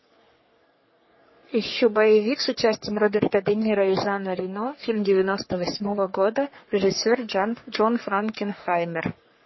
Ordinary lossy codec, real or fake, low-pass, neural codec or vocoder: MP3, 24 kbps; fake; 7.2 kHz; codec, 44.1 kHz, 3.4 kbps, Pupu-Codec